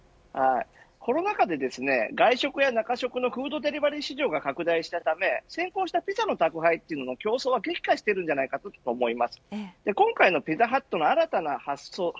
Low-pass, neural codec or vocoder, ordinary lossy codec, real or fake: none; none; none; real